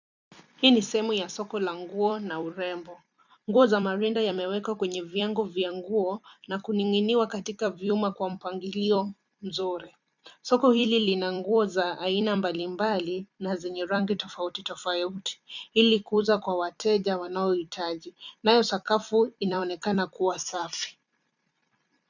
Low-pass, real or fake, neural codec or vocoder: 7.2 kHz; fake; vocoder, 44.1 kHz, 128 mel bands every 256 samples, BigVGAN v2